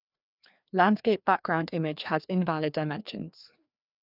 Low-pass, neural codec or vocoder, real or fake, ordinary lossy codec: 5.4 kHz; codec, 16 kHz, 2 kbps, FreqCodec, larger model; fake; none